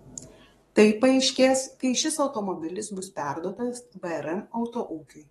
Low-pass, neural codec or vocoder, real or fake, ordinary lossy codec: 19.8 kHz; codec, 44.1 kHz, 7.8 kbps, DAC; fake; AAC, 32 kbps